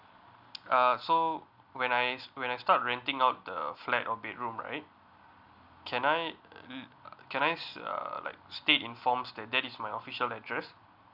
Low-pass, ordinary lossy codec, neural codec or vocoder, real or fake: 5.4 kHz; none; none; real